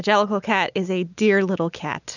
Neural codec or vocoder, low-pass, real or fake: none; 7.2 kHz; real